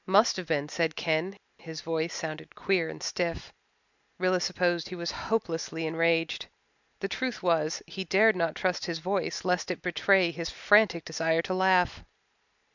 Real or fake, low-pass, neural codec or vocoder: real; 7.2 kHz; none